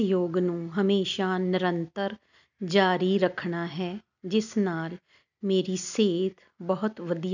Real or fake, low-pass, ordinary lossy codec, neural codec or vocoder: real; 7.2 kHz; none; none